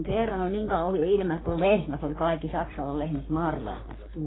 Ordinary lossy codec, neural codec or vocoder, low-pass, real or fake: AAC, 16 kbps; codec, 44.1 kHz, 3.4 kbps, Pupu-Codec; 7.2 kHz; fake